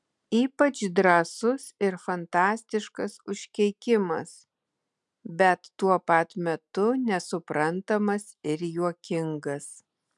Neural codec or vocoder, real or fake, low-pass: none; real; 10.8 kHz